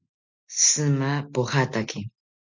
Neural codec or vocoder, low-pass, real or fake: none; 7.2 kHz; real